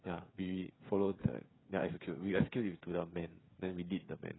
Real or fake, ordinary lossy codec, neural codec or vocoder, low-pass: fake; AAC, 16 kbps; codec, 16 kHz, 16 kbps, FreqCodec, smaller model; 7.2 kHz